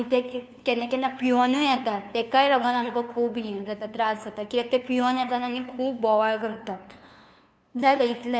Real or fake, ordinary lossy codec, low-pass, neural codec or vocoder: fake; none; none; codec, 16 kHz, 2 kbps, FunCodec, trained on LibriTTS, 25 frames a second